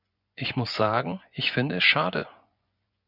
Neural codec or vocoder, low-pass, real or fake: vocoder, 24 kHz, 100 mel bands, Vocos; 5.4 kHz; fake